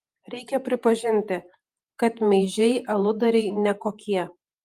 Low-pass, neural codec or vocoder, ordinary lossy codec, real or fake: 14.4 kHz; vocoder, 48 kHz, 128 mel bands, Vocos; Opus, 32 kbps; fake